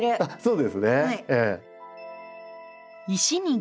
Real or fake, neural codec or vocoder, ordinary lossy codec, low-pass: real; none; none; none